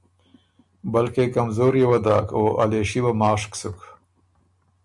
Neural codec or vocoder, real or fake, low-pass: none; real; 10.8 kHz